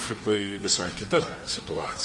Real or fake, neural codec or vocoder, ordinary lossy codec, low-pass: fake; codec, 24 kHz, 1 kbps, SNAC; Opus, 32 kbps; 10.8 kHz